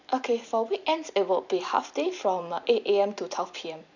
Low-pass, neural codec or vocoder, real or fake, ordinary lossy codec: 7.2 kHz; vocoder, 44.1 kHz, 128 mel bands every 256 samples, BigVGAN v2; fake; none